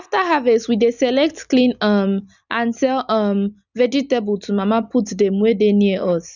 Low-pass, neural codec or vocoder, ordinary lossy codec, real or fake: 7.2 kHz; none; none; real